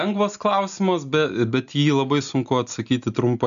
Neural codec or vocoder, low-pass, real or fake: none; 7.2 kHz; real